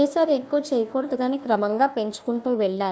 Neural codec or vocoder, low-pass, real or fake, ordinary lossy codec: codec, 16 kHz, 1 kbps, FunCodec, trained on Chinese and English, 50 frames a second; none; fake; none